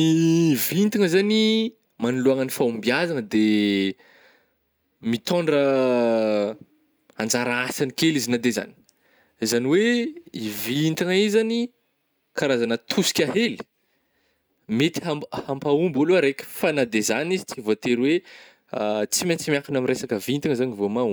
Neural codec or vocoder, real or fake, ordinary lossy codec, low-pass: none; real; none; none